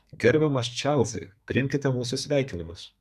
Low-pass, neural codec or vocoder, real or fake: 14.4 kHz; codec, 32 kHz, 1.9 kbps, SNAC; fake